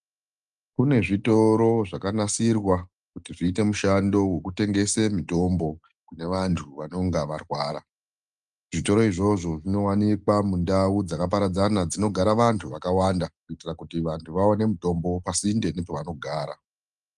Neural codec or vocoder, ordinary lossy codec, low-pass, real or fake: none; Opus, 32 kbps; 10.8 kHz; real